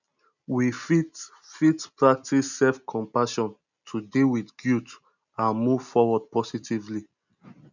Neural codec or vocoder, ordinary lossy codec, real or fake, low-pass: none; none; real; 7.2 kHz